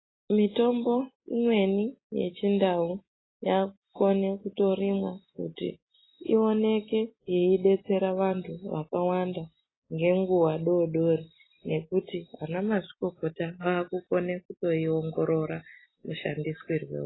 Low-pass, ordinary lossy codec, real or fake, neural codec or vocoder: 7.2 kHz; AAC, 16 kbps; real; none